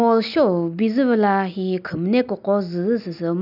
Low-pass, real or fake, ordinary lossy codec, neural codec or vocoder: 5.4 kHz; real; none; none